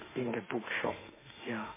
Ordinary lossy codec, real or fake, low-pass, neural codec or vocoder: MP3, 16 kbps; fake; 3.6 kHz; autoencoder, 48 kHz, 32 numbers a frame, DAC-VAE, trained on Japanese speech